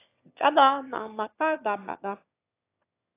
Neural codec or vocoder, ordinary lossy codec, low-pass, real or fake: autoencoder, 22.05 kHz, a latent of 192 numbers a frame, VITS, trained on one speaker; AAC, 24 kbps; 3.6 kHz; fake